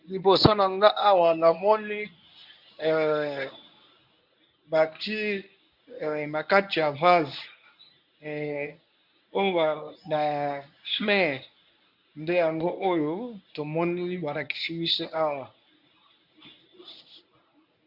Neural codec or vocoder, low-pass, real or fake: codec, 24 kHz, 0.9 kbps, WavTokenizer, medium speech release version 1; 5.4 kHz; fake